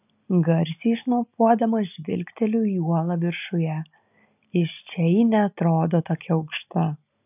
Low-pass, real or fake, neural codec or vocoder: 3.6 kHz; real; none